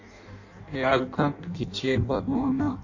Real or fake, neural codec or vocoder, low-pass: fake; codec, 16 kHz in and 24 kHz out, 0.6 kbps, FireRedTTS-2 codec; 7.2 kHz